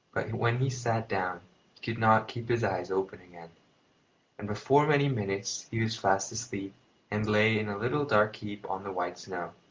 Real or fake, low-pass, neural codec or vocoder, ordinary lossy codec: real; 7.2 kHz; none; Opus, 32 kbps